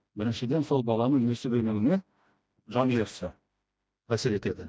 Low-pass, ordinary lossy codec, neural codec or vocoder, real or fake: none; none; codec, 16 kHz, 1 kbps, FreqCodec, smaller model; fake